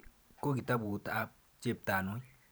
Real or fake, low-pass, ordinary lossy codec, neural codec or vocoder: fake; none; none; vocoder, 44.1 kHz, 128 mel bands every 512 samples, BigVGAN v2